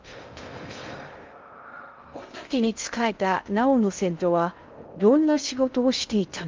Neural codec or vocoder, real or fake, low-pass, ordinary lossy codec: codec, 16 kHz in and 24 kHz out, 0.6 kbps, FocalCodec, streaming, 2048 codes; fake; 7.2 kHz; Opus, 32 kbps